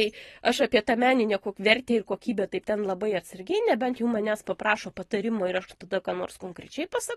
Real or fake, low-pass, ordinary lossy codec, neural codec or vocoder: real; 14.4 kHz; AAC, 32 kbps; none